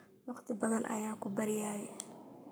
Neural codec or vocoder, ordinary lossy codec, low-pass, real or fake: codec, 44.1 kHz, 7.8 kbps, Pupu-Codec; none; none; fake